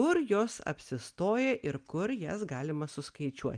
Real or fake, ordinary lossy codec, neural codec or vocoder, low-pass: real; Opus, 32 kbps; none; 9.9 kHz